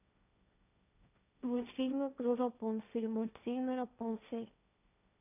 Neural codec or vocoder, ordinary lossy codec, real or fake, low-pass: codec, 16 kHz, 1.1 kbps, Voila-Tokenizer; none; fake; 3.6 kHz